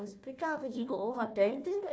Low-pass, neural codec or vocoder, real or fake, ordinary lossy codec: none; codec, 16 kHz, 1 kbps, FunCodec, trained on Chinese and English, 50 frames a second; fake; none